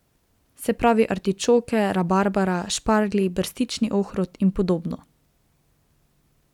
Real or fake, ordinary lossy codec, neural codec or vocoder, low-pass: real; none; none; 19.8 kHz